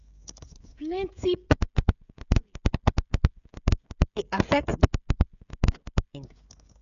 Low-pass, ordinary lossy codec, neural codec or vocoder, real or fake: 7.2 kHz; none; codec, 16 kHz, 16 kbps, FreqCodec, smaller model; fake